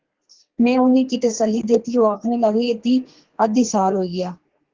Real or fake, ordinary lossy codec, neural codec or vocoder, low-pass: fake; Opus, 16 kbps; codec, 32 kHz, 1.9 kbps, SNAC; 7.2 kHz